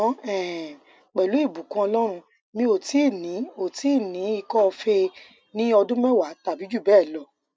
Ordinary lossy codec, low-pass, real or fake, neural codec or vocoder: none; none; real; none